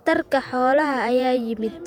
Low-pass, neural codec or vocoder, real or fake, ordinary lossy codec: 19.8 kHz; vocoder, 48 kHz, 128 mel bands, Vocos; fake; none